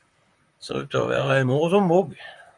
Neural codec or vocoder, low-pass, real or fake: vocoder, 44.1 kHz, 128 mel bands, Pupu-Vocoder; 10.8 kHz; fake